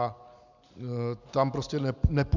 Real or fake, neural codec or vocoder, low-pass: real; none; 7.2 kHz